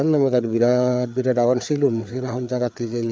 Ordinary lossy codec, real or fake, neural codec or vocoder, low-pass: none; fake; codec, 16 kHz, 4 kbps, FreqCodec, larger model; none